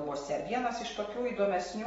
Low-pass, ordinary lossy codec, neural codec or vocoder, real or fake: 19.8 kHz; AAC, 24 kbps; none; real